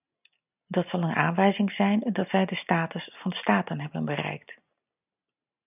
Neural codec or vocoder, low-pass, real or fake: none; 3.6 kHz; real